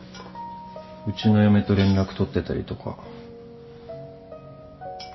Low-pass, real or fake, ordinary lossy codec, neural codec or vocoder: 7.2 kHz; real; MP3, 24 kbps; none